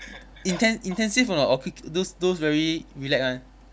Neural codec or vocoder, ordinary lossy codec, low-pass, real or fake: none; none; none; real